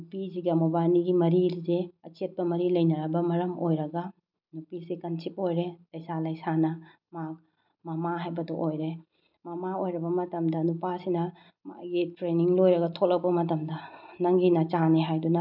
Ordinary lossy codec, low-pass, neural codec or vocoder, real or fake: none; 5.4 kHz; none; real